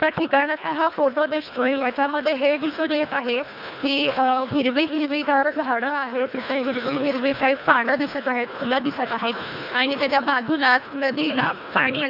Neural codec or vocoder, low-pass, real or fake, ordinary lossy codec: codec, 24 kHz, 1.5 kbps, HILCodec; 5.4 kHz; fake; none